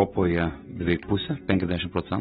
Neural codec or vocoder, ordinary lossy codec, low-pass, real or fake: none; AAC, 16 kbps; 7.2 kHz; real